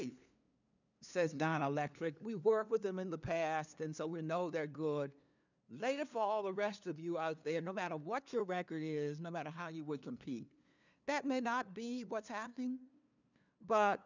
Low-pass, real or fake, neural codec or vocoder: 7.2 kHz; fake; codec, 16 kHz, 2 kbps, FunCodec, trained on LibriTTS, 25 frames a second